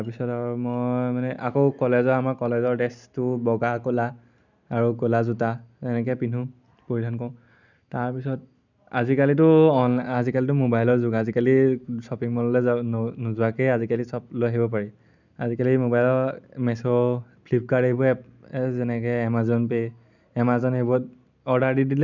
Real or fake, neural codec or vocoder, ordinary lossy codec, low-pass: real; none; none; 7.2 kHz